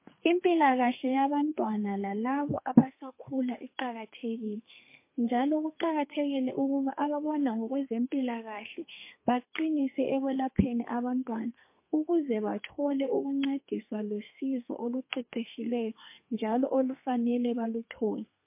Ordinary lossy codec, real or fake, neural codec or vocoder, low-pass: MP3, 24 kbps; fake; codec, 32 kHz, 1.9 kbps, SNAC; 3.6 kHz